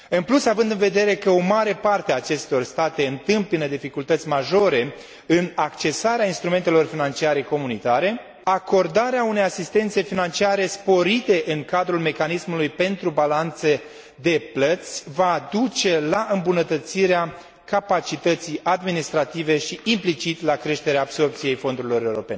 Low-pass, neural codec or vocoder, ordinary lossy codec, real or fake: none; none; none; real